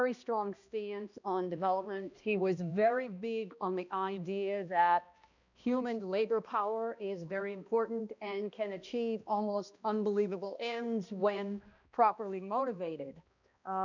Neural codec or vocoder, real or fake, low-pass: codec, 16 kHz, 1 kbps, X-Codec, HuBERT features, trained on balanced general audio; fake; 7.2 kHz